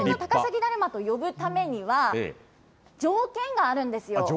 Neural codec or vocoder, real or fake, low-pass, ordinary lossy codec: none; real; none; none